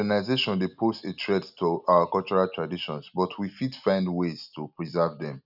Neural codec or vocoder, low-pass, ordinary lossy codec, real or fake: none; 5.4 kHz; none; real